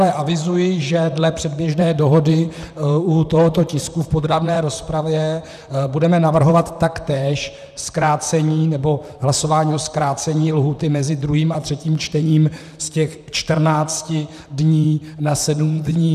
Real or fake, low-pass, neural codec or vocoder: fake; 14.4 kHz; vocoder, 44.1 kHz, 128 mel bands, Pupu-Vocoder